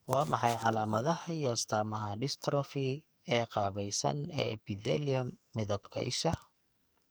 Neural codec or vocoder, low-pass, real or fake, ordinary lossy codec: codec, 44.1 kHz, 2.6 kbps, SNAC; none; fake; none